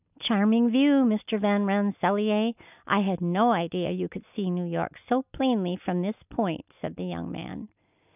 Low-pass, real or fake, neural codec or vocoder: 3.6 kHz; real; none